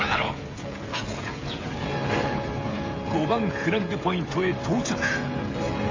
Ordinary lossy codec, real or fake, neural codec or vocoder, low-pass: AAC, 32 kbps; fake; codec, 16 kHz, 16 kbps, FreqCodec, smaller model; 7.2 kHz